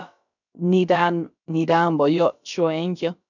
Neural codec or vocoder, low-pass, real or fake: codec, 16 kHz, about 1 kbps, DyCAST, with the encoder's durations; 7.2 kHz; fake